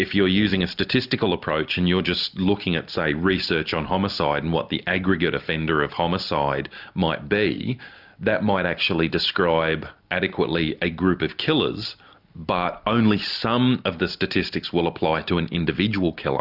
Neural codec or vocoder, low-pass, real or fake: none; 5.4 kHz; real